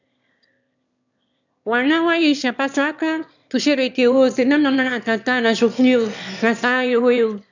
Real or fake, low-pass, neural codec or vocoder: fake; 7.2 kHz; autoencoder, 22.05 kHz, a latent of 192 numbers a frame, VITS, trained on one speaker